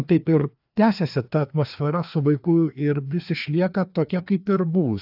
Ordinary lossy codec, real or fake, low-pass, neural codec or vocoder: AAC, 48 kbps; fake; 5.4 kHz; codec, 16 kHz, 2 kbps, FreqCodec, larger model